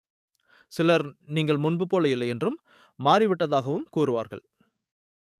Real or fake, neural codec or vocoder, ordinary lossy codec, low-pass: fake; codec, 44.1 kHz, 7.8 kbps, DAC; none; 14.4 kHz